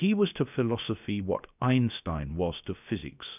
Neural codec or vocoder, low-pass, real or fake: codec, 16 kHz, 0.7 kbps, FocalCodec; 3.6 kHz; fake